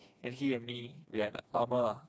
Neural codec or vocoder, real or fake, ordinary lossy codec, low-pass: codec, 16 kHz, 2 kbps, FreqCodec, smaller model; fake; none; none